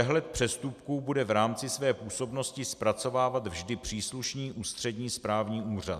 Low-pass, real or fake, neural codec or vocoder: 14.4 kHz; real; none